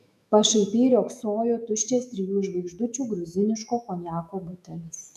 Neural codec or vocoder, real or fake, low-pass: autoencoder, 48 kHz, 128 numbers a frame, DAC-VAE, trained on Japanese speech; fake; 14.4 kHz